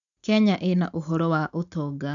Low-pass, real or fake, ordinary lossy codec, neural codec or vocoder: 7.2 kHz; real; none; none